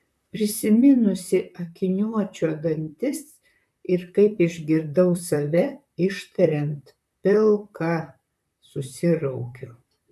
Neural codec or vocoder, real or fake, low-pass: vocoder, 44.1 kHz, 128 mel bands, Pupu-Vocoder; fake; 14.4 kHz